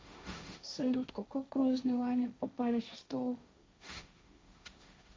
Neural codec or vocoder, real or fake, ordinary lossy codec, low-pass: codec, 16 kHz, 1.1 kbps, Voila-Tokenizer; fake; none; none